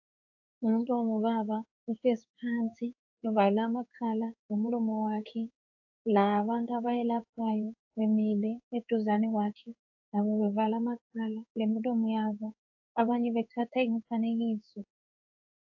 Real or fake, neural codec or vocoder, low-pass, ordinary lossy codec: fake; codec, 16 kHz in and 24 kHz out, 1 kbps, XY-Tokenizer; 7.2 kHz; AAC, 48 kbps